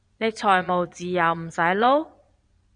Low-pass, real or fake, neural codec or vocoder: 9.9 kHz; fake; vocoder, 22.05 kHz, 80 mel bands, Vocos